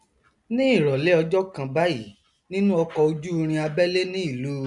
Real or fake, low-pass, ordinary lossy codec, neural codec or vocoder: real; 10.8 kHz; none; none